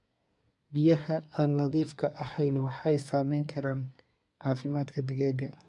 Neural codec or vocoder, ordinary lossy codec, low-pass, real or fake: codec, 24 kHz, 1 kbps, SNAC; none; 10.8 kHz; fake